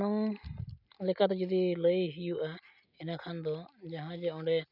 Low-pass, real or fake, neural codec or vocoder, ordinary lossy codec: 5.4 kHz; real; none; none